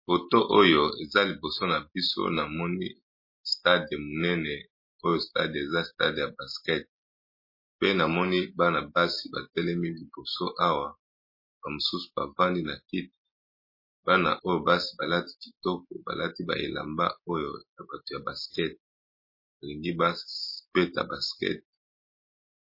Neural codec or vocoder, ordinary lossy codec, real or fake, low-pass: none; MP3, 24 kbps; real; 5.4 kHz